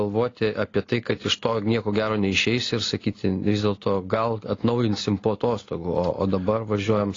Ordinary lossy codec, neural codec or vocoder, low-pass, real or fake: AAC, 32 kbps; none; 7.2 kHz; real